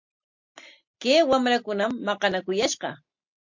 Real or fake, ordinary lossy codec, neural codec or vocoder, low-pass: real; MP3, 48 kbps; none; 7.2 kHz